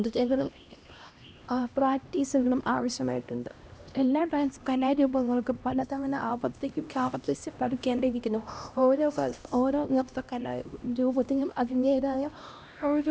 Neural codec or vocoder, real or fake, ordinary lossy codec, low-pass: codec, 16 kHz, 1 kbps, X-Codec, HuBERT features, trained on LibriSpeech; fake; none; none